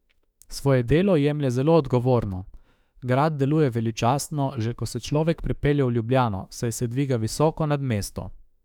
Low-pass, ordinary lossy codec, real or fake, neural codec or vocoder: 19.8 kHz; none; fake; autoencoder, 48 kHz, 32 numbers a frame, DAC-VAE, trained on Japanese speech